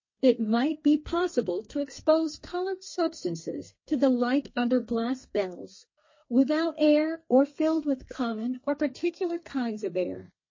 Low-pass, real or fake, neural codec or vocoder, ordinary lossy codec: 7.2 kHz; fake; codec, 32 kHz, 1.9 kbps, SNAC; MP3, 32 kbps